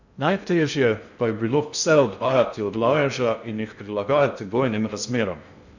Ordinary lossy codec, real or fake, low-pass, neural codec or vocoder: none; fake; 7.2 kHz; codec, 16 kHz in and 24 kHz out, 0.6 kbps, FocalCodec, streaming, 2048 codes